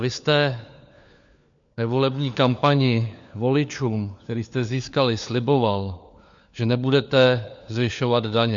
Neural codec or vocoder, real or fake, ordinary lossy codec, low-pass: codec, 16 kHz, 4 kbps, FunCodec, trained on LibriTTS, 50 frames a second; fake; MP3, 64 kbps; 7.2 kHz